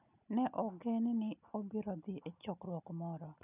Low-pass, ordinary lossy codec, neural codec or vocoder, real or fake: 3.6 kHz; none; none; real